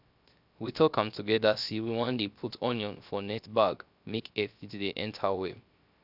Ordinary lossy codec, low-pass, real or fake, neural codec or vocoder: none; 5.4 kHz; fake; codec, 16 kHz, 0.3 kbps, FocalCodec